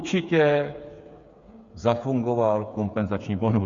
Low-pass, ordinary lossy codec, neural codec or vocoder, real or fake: 7.2 kHz; Opus, 64 kbps; codec, 16 kHz, 8 kbps, FreqCodec, smaller model; fake